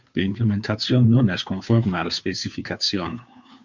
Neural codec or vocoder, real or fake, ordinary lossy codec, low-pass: codec, 16 kHz, 2 kbps, FunCodec, trained on Chinese and English, 25 frames a second; fake; MP3, 64 kbps; 7.2 kHz